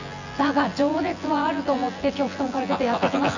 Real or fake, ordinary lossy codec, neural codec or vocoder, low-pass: fake; none; vocoder, 24 kHz, 100 mel bands, Vocos; 7.2 kHz